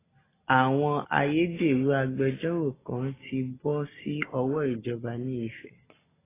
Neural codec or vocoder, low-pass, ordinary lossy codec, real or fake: none; 3.6 kHz; AAC, 16 kbps; real